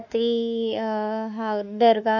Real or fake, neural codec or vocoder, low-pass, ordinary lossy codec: fake; autoencoder, 48 kHz, 32 numbers a frame, DAC-VAE, trained on Japanese speech; 7.2 kHz; none